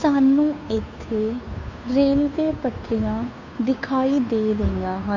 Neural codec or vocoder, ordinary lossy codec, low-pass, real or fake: codec, 16 kHz, 2 kbps, FunCodec, trained on Chinese and English, 25 frames a second; none; 7.2 kHz; fake